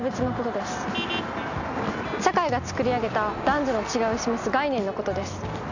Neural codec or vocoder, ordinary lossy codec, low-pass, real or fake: none; none; 7.2 kHz; real